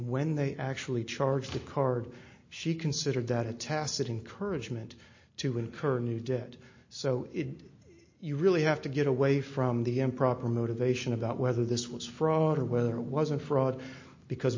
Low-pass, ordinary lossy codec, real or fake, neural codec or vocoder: 7.2 kHz; MP3, 32 kbps; real; none